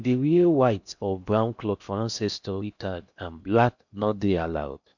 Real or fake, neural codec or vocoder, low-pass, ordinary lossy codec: fake; codec, 16 kHz in and 24 kHz out, 0.6 kbps, FocalCodec, streaming, 4096 codes; 7.2 kHz; none